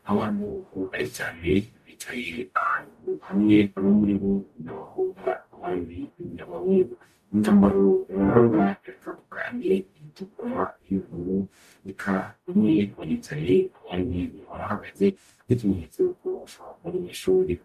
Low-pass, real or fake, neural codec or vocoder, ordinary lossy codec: 14.4 kHz; fake; codec, 44.1 kHz, 0.9 kbps, DAC; MP3, 96 kbps